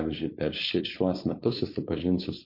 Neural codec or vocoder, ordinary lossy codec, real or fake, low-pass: codec, 16 kHz, 4.8 kbps, FACodec; MP3, 32 kbps; fake; 5.4 kHz